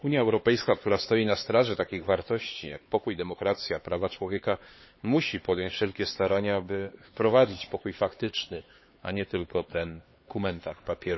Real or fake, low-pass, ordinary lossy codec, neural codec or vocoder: fake; 7.2 kHz; MP3, 24 kbps; codec, 16 kHz, 4 kbps, X-Codec, HuBERT features, trained on LibriSpeech